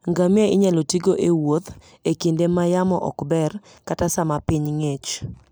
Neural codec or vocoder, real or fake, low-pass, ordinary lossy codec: none; real; none; none